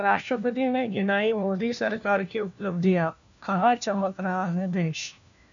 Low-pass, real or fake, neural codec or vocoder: 7.2 kHz; fake; codec, 16 kHz, 1 kbps, FunCodec, trained on LibriTTS, 50 frames a second